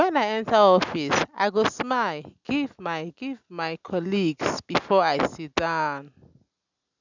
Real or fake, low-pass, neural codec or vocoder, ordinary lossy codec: real; 7.2 kHz; none; none